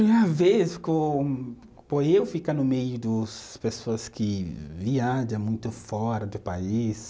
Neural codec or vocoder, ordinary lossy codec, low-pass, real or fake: none; none; none; real